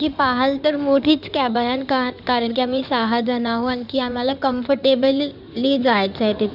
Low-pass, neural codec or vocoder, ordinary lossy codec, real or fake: 5.4 kHz; codec, 16 kHz in and 24 kHz out, 2.2 kbps, FireRedTTS-2 codec; none; fake